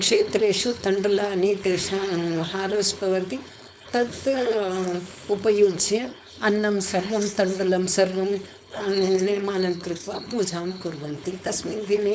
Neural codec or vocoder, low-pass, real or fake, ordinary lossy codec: codec, 16 kHz, 4.8 kbps, FACodec; none; fake; none